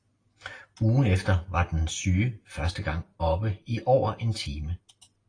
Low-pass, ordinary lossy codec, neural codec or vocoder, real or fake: 9.9 kHz; AAC, 32 kbps; none; real